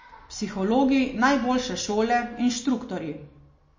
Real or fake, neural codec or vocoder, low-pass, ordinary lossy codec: real; none; 7.2 kHz; MP3, 32 kbps